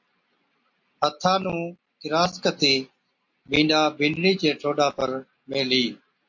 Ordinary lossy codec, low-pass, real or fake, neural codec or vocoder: MP3, 48 kbps; 7.2 kHz; real; none